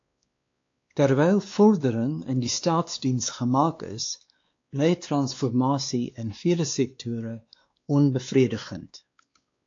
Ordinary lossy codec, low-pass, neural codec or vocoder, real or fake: AAC, 48 kbps; 7.2 kHz; codec, 16 kHz, 2 kbps, X-Codec, WavLM features, trained on Multilingual LibriSpeech; fake